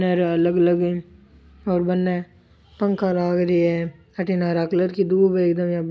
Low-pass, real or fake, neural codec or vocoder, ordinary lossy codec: none; real; none; none